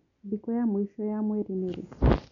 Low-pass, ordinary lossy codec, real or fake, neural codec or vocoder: 7.2 kHz; none; real; none